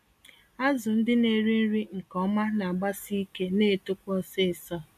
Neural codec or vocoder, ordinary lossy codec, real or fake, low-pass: none; AAC, 96 kbps; real; 14.4 kHz